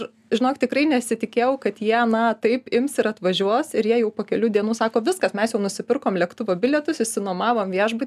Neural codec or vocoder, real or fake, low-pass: none; real; 14.4 kHz